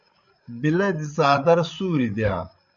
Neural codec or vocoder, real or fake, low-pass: codec, 16 kHz, 8 kbps, FreqCodec, larger model; fake; 7.2 kHz